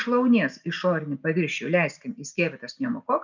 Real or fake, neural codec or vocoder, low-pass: real; none; 7.2 kHz